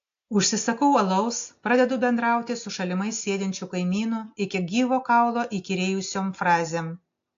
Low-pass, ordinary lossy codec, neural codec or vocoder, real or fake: 7.2 kHz; AAC, 48 kbps; none; real